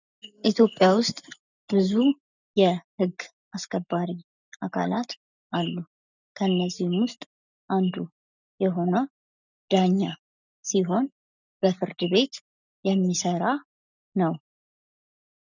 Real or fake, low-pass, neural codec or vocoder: real; 7.2 kHz; none